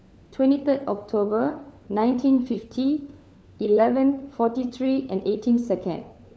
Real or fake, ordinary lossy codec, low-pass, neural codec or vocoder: fake; none; none; codec, 16 kHz, 4 kbps, FunCodec, trained on LibriTTS, 50 frames a second